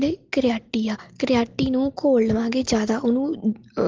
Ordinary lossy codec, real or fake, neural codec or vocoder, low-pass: Opus, 16 kbps; real; none; 7.2 kHz